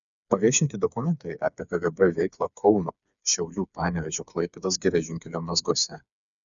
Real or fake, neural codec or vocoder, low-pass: fake; codec, 16 kHz, 4 kbps, FreqCodec, smaller model; 7.2 kHz